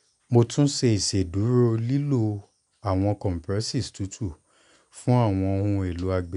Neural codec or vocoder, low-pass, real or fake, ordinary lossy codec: none; 10.8 kHz; real; none